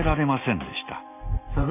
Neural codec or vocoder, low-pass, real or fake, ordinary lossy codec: none; 3.6 kHz; real; none